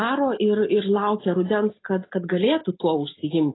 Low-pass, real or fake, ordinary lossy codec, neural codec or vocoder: 7.2 kHz; real; AAC, 16 kbps; none